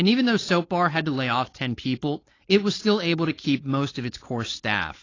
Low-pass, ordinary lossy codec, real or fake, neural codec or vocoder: 7.2 kHz; AAC, 32 kbps; fake; codec, 16 kHz, 4.8 kbps, FACodec